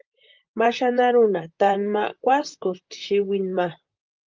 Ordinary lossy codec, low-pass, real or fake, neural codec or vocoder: Opus, 32 kbps; 7.2 kHz; fake; vocoder, 44.1 kHz, 128 mel bands, Pupu-Vocoder